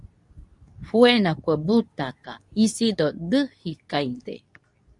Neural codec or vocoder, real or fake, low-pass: codec, 24 kHz, 0.9 kbps, WavTokenizer, medium speech release version 2; fake; 10.8 kHz